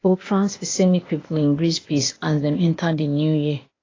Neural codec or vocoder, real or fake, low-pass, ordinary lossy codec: codec, 16 kHz, 0.8 kbps, ZipCodec; fake; 7.2 kHz; AAC, 32 kbps